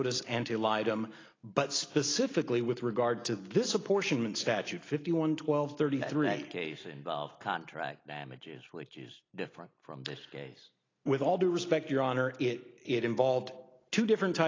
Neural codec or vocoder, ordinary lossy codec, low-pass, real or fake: none; AAC, 32 kbps; 7.2 kHz; real